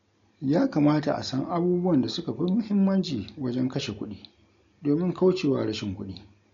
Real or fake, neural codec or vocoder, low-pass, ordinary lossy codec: real; none; 7.2 kHz; MP3, 48 kbps